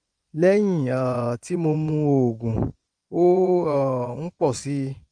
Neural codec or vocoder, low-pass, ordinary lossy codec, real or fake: vocoder, 24 kHz, 100 mel bands, Vocos; 9.9 kHz; Opus, 32 kbps; fake